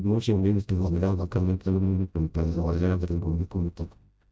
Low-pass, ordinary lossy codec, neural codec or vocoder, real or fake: none; none; codec, 16 kHz, 0.5 kbps, FreqCodec, smaller model; fake